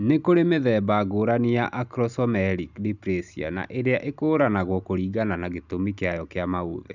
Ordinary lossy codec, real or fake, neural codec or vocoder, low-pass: none; real; none; 7.2 kHz